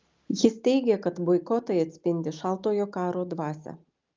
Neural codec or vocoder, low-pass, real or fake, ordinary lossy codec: none; 7.2 kHz; real; Opus, 24 kbps